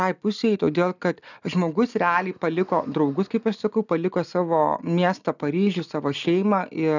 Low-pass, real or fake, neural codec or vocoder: 7.2 kHz; real; none